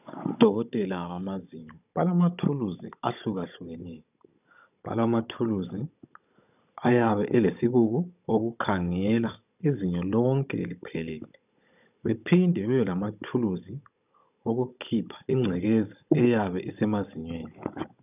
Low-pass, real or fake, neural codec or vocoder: 3.6 kHz; fake; codec, 16 kHz, 16 kbps, FunCodec, trained on Chinese and English, 50 frames a second